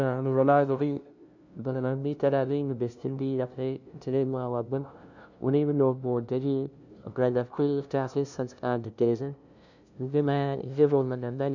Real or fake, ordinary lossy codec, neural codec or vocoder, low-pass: fake; none; codec, 16 kHz, 0.5 kbps, FunCodec, trained on LibriTTS, 25 frames a second; 7.2 kHz